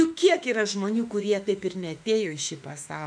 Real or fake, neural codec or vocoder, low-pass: fake; autoencoder, 48 kHz, 32 numbers a frame, DAC-VAE, trained on Japanese speech; 9.9 kHz